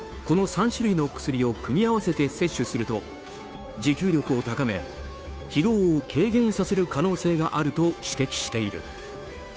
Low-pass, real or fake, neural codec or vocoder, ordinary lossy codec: none; fake; codec, 16 kHz, 2 kbps, FunCodec, trained on Chinese and English, 25 frames a second; none